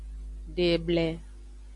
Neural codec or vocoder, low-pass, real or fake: none; 10.8 kHz; real